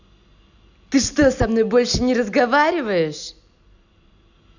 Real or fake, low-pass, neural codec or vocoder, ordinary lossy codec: real; 7.2 kHz; none; none